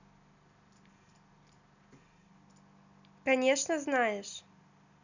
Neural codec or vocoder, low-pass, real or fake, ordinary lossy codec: none; 7.2 kHz; real; none